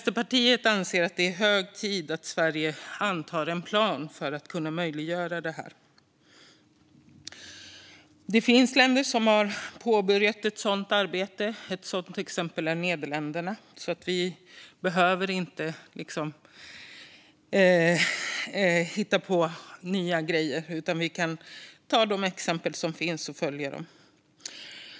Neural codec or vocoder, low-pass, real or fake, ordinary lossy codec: none; none; real; none